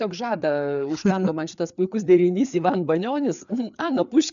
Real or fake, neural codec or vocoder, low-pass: fake; codec, 16 kHz, 4 kbps, FreqCodec, larger model; 7.2 kHz